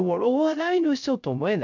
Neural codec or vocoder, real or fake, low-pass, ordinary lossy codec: codec, 16 kHz, 0.3 kbps, FocalCodec; fake; 7.2 kHz; none